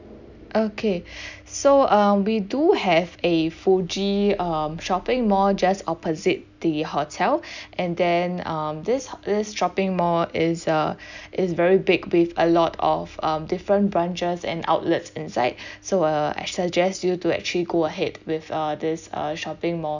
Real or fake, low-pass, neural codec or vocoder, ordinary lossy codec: real; 7.2 kHz; none; none